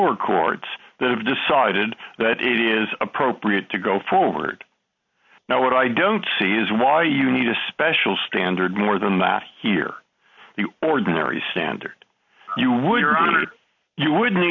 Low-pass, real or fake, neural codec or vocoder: 7.2 kHz; real; none